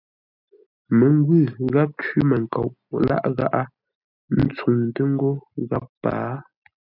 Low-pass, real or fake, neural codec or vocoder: 5.4 kHz; real; none